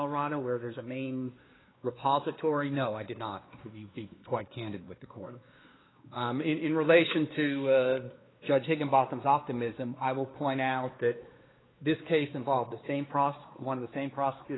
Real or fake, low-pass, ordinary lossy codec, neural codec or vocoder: fake; 7.2 kHz; AAC, 16 kbps; codec, 16 kHz, 2 kbps, X-Codec, WavLM features, trained on Multilingual LibriSpeech